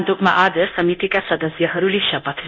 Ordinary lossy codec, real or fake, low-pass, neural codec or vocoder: none; fake; 7.2 kHz; codec, 24 kHz, 0.9 kbps, DualCodec